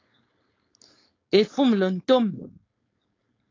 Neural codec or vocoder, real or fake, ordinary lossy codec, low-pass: codec, 16 kHz, 4.8 kbps, FACodec; fake; AAC, 32 kbps; 7.2 kHz